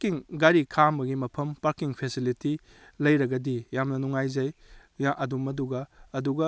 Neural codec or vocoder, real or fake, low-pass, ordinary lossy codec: none; real; none; none